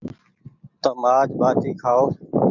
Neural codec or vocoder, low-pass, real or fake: none; 7.2 kHz; real